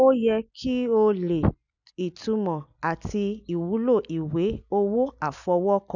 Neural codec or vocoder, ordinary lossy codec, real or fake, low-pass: none; none; real; 7.2 kHz